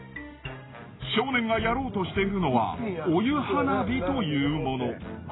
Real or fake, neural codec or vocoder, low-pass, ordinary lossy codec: real; none; 7.2 kHz; AAC, 16 kbps